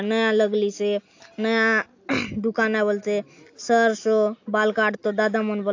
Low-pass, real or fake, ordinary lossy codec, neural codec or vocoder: 7.2 kHz; real; AAC, 48 kbps; none